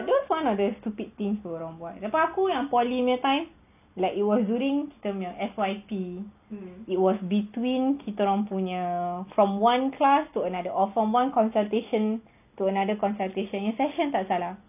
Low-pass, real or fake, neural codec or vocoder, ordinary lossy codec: 3.6 kHz; real; none; none